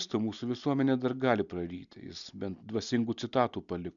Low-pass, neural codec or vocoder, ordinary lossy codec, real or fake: 7.2 kHz; none; MP3, 96 kbps; real